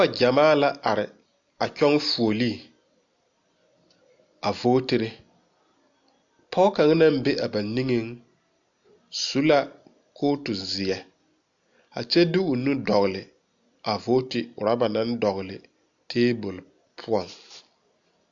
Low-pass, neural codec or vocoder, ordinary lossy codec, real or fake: 7.2 kHz; none; AAC, 64 kbps; real